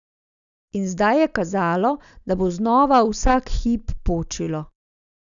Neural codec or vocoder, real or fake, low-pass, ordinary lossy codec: none; real; 7.2 kHz; none